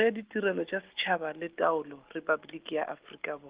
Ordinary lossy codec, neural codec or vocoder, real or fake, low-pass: Opus, 16 kbps; none; real; 3.6 kHz